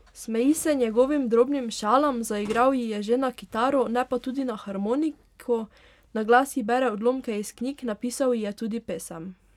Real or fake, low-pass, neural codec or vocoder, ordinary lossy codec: real; 19.8 kHz; none; none